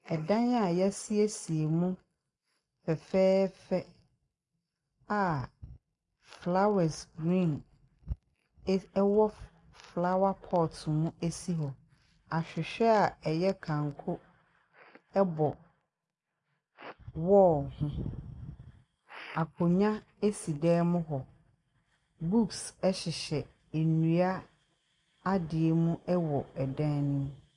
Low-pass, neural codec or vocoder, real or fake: 10.8 kHz; none; real